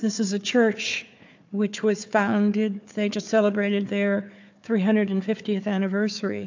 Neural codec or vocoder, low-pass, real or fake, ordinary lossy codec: codec, 16 kHz, 4 kbps, FunCodec, trained on Chinese and English, 50 frames a second; 7.2 kHz; fake; AAC, 48 kbps